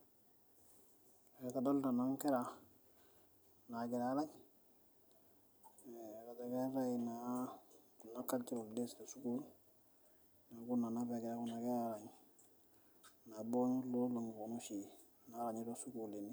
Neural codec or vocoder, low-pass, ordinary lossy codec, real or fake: none; none; none; real